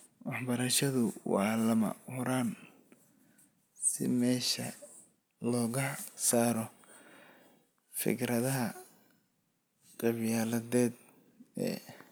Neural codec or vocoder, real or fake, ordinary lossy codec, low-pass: none; real; none; none